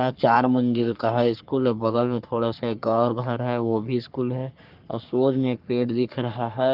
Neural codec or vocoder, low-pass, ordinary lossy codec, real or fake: codec, 44.1 kHz, 3.4 kbps, Pupu-Codec; 5.4 kHz; Opus, 32 kbps; fake